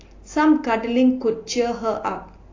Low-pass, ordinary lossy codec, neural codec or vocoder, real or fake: 7.2 kHz; AAC, 32 kbps; none; real